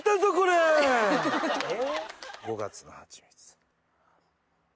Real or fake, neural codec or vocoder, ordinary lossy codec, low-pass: real; none; none; none